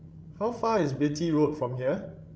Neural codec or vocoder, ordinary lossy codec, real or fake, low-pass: codec, 16 kHz, 16 kbps, FreqCodec, larger model; none; fake; none